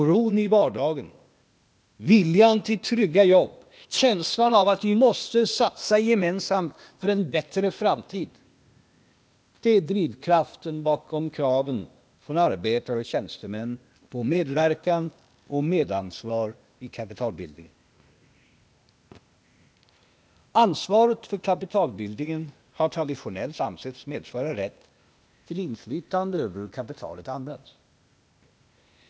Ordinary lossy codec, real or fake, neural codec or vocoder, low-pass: none; fake; codec, 16 kHz, 0.8 kbps, ZipCodec; none